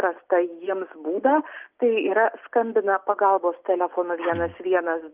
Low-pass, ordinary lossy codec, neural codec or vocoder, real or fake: 3.6 kHz; Opus, 24 kbps; none; real